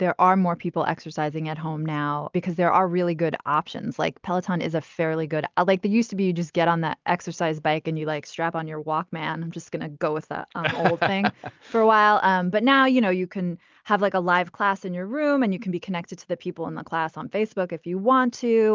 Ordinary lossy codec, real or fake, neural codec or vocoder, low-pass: Opus, 24 kbps; real; none; 7.2 kHz